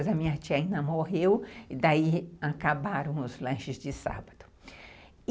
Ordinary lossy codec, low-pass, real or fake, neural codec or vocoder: none; none; real; none